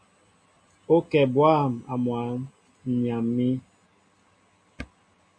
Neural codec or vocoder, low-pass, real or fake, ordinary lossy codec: none; 9.9 kHz; real; AAC, 64 kbps